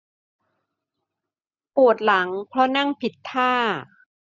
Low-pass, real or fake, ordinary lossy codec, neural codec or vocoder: 7.2 kHz; real; none; none